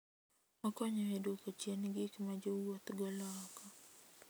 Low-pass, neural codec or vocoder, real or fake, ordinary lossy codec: none; none; real; none